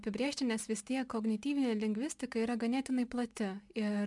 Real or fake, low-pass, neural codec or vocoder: real; 10.8 kHz; none